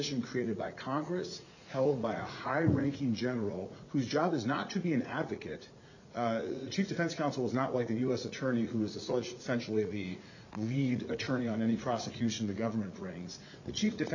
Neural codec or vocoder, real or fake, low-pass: vocoder, 44.1 kHz, 80 mel bands, Vocos; fake; 7.2 kHz